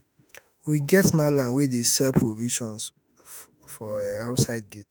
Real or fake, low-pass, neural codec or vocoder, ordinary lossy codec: fake; none; autoencoder, 48 kHz, 32 numbers a frame, DAC-VAE, trained on Japanese speech; none